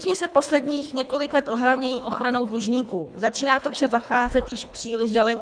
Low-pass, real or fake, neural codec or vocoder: 9.9 kHz; fake; codec, 24 kHz, 1.5 kbps, HILCodec